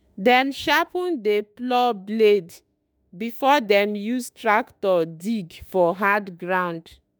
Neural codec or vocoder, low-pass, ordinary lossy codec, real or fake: autoencoder, 48 kHz, 32 numbers a frame, DAC-VAE, trained on Japanese speech; none; none; fake